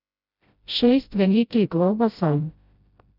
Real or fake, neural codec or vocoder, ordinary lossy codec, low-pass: fake; codec, 16 kHz, 0.5 kbps, FreqCodec, smaller model; none; 5.4 kHz